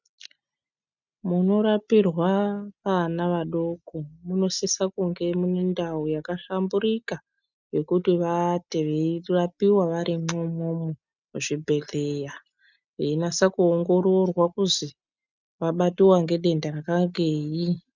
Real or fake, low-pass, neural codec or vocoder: real; 7.2 kHz; none